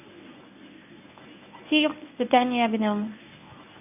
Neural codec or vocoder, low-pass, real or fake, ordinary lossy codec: codec, 24 kHz, 0.9 kbps, WavTokenizer, medium speech release version 1; 3.6 kHz; fake; none